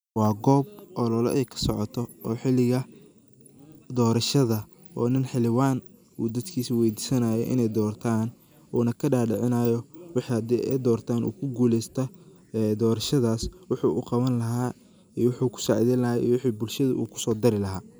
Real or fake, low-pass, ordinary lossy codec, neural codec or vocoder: real; none; none; none